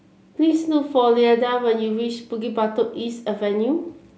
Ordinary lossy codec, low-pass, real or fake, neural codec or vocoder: none; none; real; none